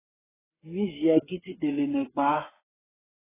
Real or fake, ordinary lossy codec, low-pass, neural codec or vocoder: fake; AAC, 16 kbps; 3.6 kHz; codec, 16 kHz, 8 kbps, FreqCodec, smaller model